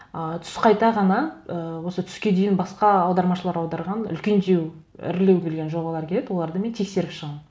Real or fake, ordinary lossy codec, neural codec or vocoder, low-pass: real; none; none; none